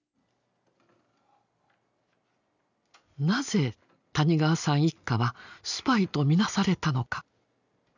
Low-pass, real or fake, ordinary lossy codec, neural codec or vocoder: 7.2 kHz; real; none; none